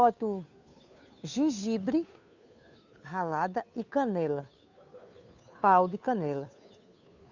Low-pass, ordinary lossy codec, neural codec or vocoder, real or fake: 7.2 kHz; none; codec, 16 kHz, 2 kbps, FunCodec, trained on Chinese and English, 25 frames a second; fake